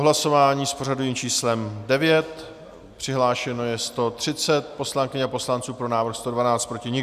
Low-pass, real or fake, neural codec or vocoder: 14.4 kHz; real; none